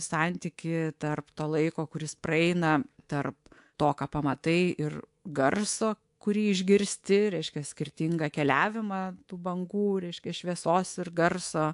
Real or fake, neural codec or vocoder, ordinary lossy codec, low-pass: fake; codec, 24 kHz, 3.1 kbps, DualCodec; AAC, 64 kbps; 10.8 kHz